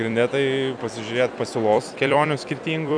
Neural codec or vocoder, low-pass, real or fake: vocoder, 44.1 kHz, 128 mel bands every 256 samples, BigVGAN v2; 9.9 kHz; fake